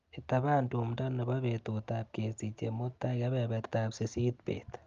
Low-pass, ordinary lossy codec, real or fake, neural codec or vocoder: 7.2 kHz; Opus, 32 kbps; real; none